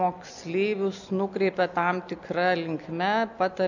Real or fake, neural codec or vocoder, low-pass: real; none; 7.2 kHz